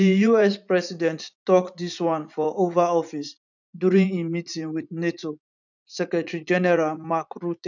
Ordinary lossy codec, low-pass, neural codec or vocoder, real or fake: none; 7.2 kHz; vocoder, 44.1 kHz, 80 mel bands, Vocos; fake